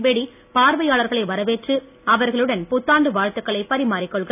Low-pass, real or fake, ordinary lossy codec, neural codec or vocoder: 3.6 kHz; real; none; none